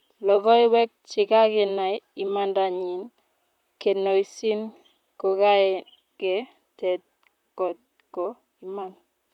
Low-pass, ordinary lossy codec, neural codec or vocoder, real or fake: 19.8 kHz; none; vocoder, 44.1 kHz, 128 mel bands, Pupu-Vocoder; fake